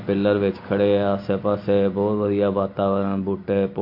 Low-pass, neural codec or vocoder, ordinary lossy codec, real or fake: 5.4 kHz; none; MP3, 32 kbps; real